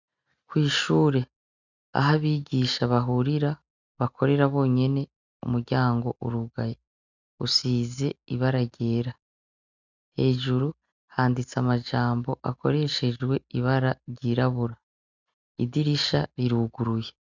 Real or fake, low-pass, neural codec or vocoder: real; 7.2 kHz; none